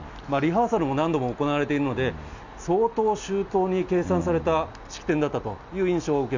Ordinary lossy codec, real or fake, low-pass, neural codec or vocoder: none; real; 7.2 kHz; none